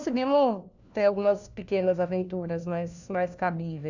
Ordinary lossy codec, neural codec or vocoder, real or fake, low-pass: none; codec, 16 kHz, 1 kbps, FunCodec, trained on LibriTTS, 50 frames a second; fake; 7.2 kHz